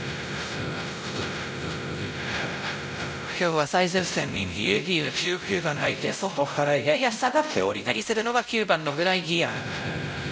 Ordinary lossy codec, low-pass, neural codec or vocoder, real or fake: none; none; codec, 16 kHz, 0.5 kbps, X-Codec, WavLM features, trained on Multilingual LibriSpeech; fake